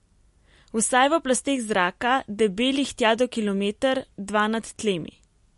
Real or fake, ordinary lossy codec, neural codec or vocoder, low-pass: real; MP3, 48 kbps; none; 14.4 kHz